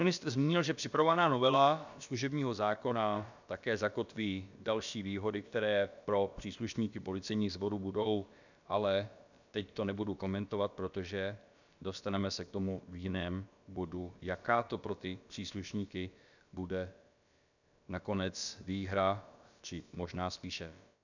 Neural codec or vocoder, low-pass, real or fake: codec, 16 kHz, about 1 kbps, DyCAST, with the encoder's durations; 7.2 kHz; fake